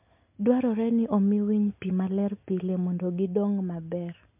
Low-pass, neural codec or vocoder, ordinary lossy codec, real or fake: 3.6 kHz; none; MP3, 32 kbps; real